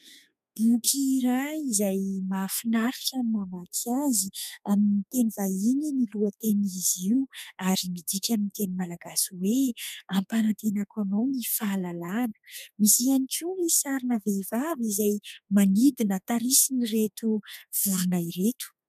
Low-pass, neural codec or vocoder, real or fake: 14.4 kHz; codec, 32 kHz, 1.9 kbps, SNAC; fake